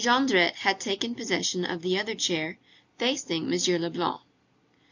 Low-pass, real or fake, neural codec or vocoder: 7.2 kHz; real; none